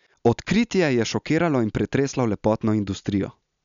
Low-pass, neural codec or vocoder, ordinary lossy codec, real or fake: 7.2 kHz; none; MP3, 96 kbps; real